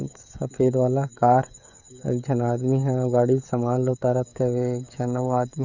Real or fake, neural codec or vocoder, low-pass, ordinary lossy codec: fake; codec, 16 kHz, 16 kbps, FreqCodec, smaller model; 7.2 kHz; none